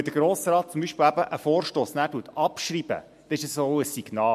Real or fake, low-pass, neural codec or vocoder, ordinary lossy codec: real; 14.4 kHz; none; MP3, 64 kbps